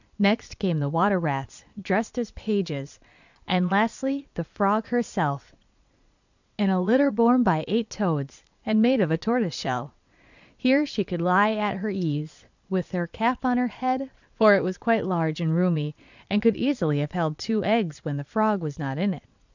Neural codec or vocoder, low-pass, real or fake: vocoder, 22.05 kHz, 80 mel bands, Vocos; 7.2 kHz; fake